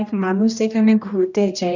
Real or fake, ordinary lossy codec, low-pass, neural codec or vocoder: fake; none; 7.2 kHz; codec, 16 kHz, 1 kbps, X-Codec, HuBERT features, trained on general audio